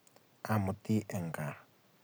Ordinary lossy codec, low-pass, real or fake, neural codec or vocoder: none; none; real; none